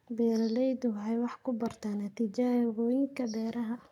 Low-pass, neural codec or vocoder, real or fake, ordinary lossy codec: 19.8 kHz; codec, 44.1 kHz, 7.8 kbps, Pupu-Codec; fake; none